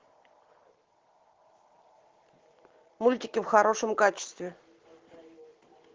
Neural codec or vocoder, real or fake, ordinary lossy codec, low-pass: none; real; Opus, 16 kbps; 7.2 kHz